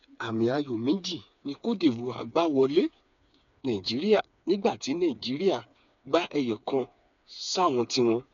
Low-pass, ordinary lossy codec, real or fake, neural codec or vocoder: 7.2 kHz; none; fake; codec, 16 kHz, 4 kbps, FreqCodec, smaller model